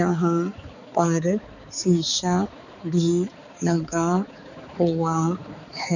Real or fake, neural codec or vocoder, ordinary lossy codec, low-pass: fake; codec, 16 kHz, 4 kbps, X-Codec, HuBERT features, trained on balanced general audio; none; 7.2 kHz